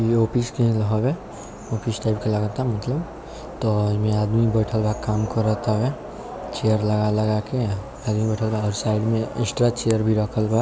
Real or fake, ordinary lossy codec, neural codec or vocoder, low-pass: real; none; none; none